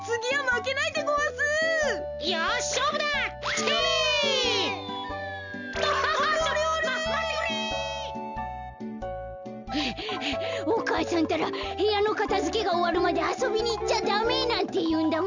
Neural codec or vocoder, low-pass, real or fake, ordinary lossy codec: none; 7.2 kHz; real; Opus, 64 kbps